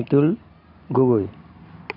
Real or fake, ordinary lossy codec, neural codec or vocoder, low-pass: real; MP3, 48 kbps; none; 5.4 kHz